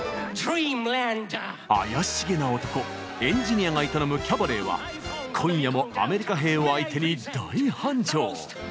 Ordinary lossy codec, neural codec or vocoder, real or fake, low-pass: none; none; real; none